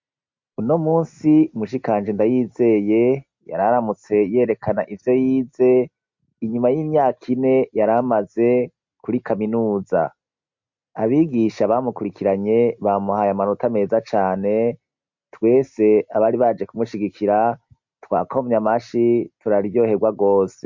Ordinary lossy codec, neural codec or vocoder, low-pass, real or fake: MP3, 48 kbps; none; 7.2 kHz; real